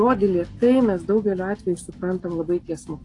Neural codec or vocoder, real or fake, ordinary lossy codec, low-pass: none; real; AAC, 48 kbps; 10.8 kHz